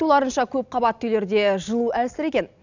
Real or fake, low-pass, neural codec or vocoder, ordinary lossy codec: real; 7.2 kHz; none; none